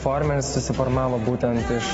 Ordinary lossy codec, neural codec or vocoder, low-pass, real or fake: AAC, 24 kbps; none; 19.8 kHz; real